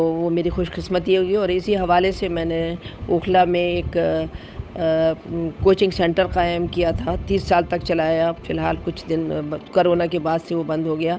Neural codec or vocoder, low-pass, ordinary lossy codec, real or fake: codec, 16 kHz, 8 kbps, FunCodec, trained on Chinese and English, 25 frames a second; none; none; fake